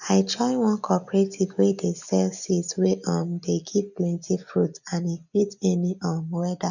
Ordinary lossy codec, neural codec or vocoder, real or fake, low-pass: none; none; real; 7.2 kHz